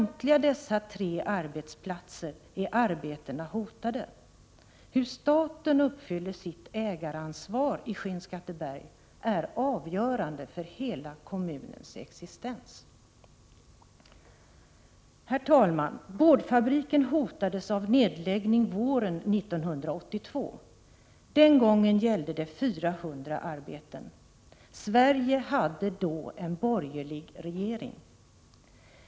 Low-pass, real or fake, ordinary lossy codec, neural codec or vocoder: none; real; none; none